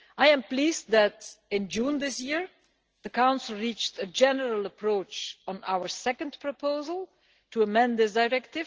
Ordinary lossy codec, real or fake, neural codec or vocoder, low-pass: Opus, 16 kbps; real; none; 7.2 kHz